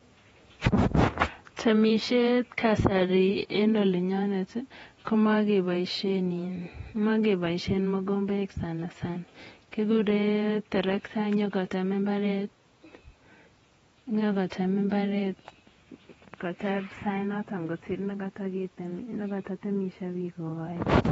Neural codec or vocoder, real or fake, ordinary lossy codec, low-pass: vocoder, 48 kHz, 128 mel bands, Vocos; fake; AAC, 24 kbps; 19.8 kHz